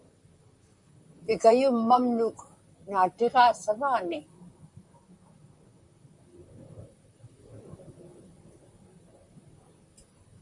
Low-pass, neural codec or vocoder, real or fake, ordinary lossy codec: 10.8 kHz; vocoder, 44.1 kHz, 128 mel bands, Pupu-Vocoder; fake; MP3, 64 kbps